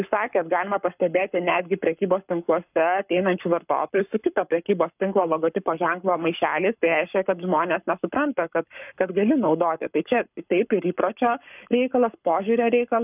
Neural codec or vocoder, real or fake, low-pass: codec, 44.1 kHz, 7.8 kbps, Pupu-Codec; fake; 3.6 kHz